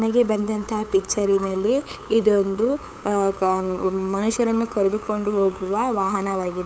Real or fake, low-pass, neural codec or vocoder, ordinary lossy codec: fake; none; codec, 16 kHz, 8 kbps, FunCodec, trained on LibriTTS, 25 frames a second; none